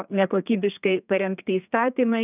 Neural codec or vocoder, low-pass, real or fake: codec, 32 kHz, 1.9 kbps, SNAC; 3.6 kHz; fake